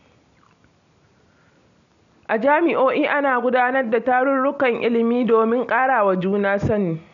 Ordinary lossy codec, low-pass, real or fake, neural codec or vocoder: none; 7.2 kHz; real; none